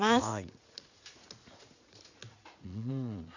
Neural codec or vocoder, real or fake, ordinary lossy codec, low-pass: vocoder, 44.1 kHz, 80 mel bands, Vocos; fake; none; 7.2 kHz